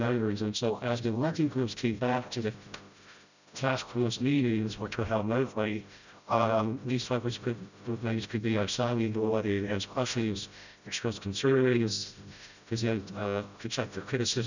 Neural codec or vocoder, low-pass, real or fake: codec, 16 kHz, 0.5 kbps, FreqCodec, smaller model; 7.2 kHz; fake